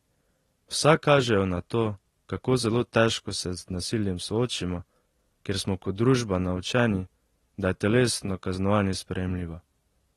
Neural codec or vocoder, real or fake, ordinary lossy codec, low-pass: none; real; AAC, 32 kbps; 19.8 kHz